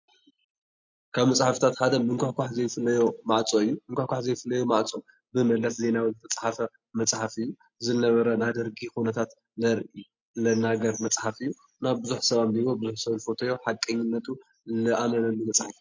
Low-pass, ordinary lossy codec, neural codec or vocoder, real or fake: 7.2 kHz; MP3, 48 kbps; none; real